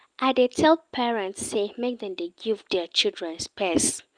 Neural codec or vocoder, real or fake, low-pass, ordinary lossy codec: none; real; 9.9 kHz; Opus, 32 kbps